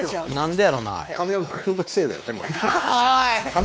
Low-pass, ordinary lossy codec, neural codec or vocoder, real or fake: none; none; codec, 16 kHz, 2 kbps, X-Codec, WavLM features, trained on Multilingual LibriSpeech; fake